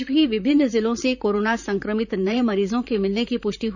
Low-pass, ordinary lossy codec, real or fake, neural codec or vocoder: 7.2 kHz; none; fake; vocoder, 44.1 kHz, 128 mel bands, Pupu-Vocoder